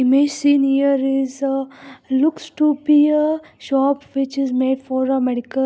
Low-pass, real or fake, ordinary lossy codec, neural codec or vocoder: none; real; none; none